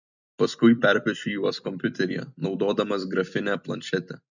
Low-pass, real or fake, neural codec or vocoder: 7.2 kHz; fake; vocoder, 44.1 kHz, 128 mel bands every 256 samples, BigVGAN v2